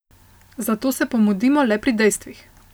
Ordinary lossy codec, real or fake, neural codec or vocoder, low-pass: none; real; none; none